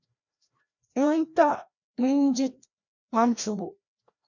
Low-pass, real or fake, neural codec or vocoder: 7.2 kHz; fake; codec, 16 kHz, 1 kbps, FreqCodec, larger model